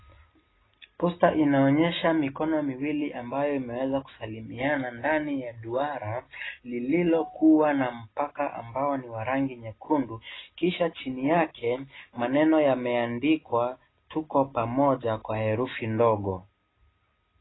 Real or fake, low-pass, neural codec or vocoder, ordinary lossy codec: real; 7.2 kHz; none; AAC, 16 kbps